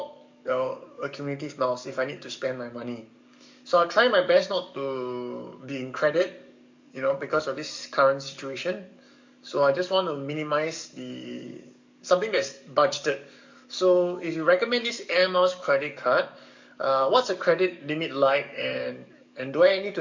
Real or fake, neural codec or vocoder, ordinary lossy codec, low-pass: fake; codec, 44.1 kHz, 7.8 kbps, DAC; MP3, 64 kbps; 7.2 kHz